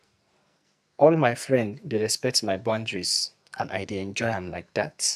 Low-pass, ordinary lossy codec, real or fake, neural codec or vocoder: 14.4 kHz; AAC, 96 kbps; fake; codec, 44.1 kHz, 2.6 kbps, SNAC